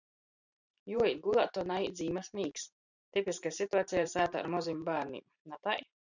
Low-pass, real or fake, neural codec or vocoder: 7.2 kHz; fake; vocoder, 44.1 kHz, 128 mel bands every 512 samples, BigVGAN v2